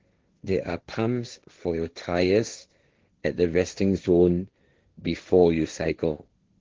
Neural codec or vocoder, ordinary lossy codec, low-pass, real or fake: codec, 16 kHz, 1.1 kbps, Voila-Tokenizer; Opus, 16 kbps; 7.2 kHz; fake